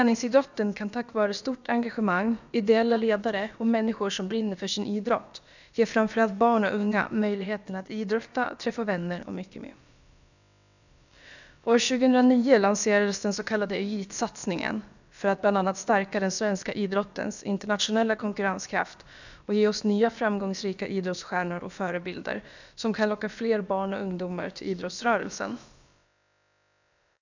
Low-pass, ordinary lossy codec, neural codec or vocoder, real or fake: 7.2 kHz; none; codec, 16 kHz, about 1 kbps, DyCAST, with the encoder's durations; fake